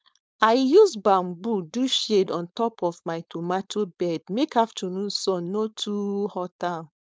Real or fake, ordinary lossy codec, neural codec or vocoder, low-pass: fake; none; codec, 16 kHz, 4.8 kbps, FACodec; none